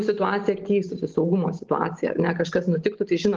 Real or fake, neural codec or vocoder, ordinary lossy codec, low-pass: real; none; Opus, 16 kbps; 7.2 kHz